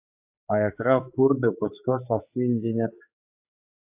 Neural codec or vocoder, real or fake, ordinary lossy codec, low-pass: codec, 16 kHz, 4 kbps, X-Codec, HuBERT features, trained on general audio; fake; AAC, 32 kbps; 3.6 kHz